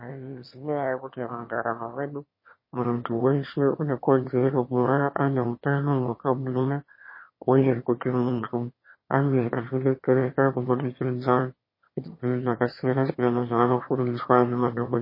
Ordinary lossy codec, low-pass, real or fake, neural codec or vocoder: MP3, 24 kbps; 5.4 kHz; fake; autoencoder, 22.05 kHz, a latent of 192 numbers a frame, VITS, trained on one speaker